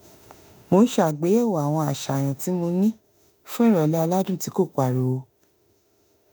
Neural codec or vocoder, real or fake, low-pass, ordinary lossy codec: autoencoder, 48 kHz, 32 numbers a frame, DAC-VAE, trained on Japanese speech; fake; none; none